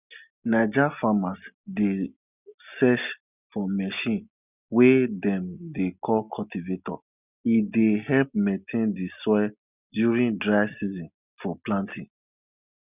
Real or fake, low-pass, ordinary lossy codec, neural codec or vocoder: real; 3.6 kHz; none; none